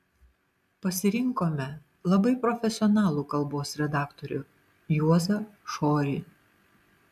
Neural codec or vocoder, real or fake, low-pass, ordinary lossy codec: none; real; 14.4 kHz; AAC, 96 kbps